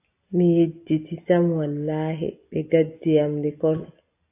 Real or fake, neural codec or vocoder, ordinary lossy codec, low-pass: real; none; AAC, 24 kbps; 3.6 kHz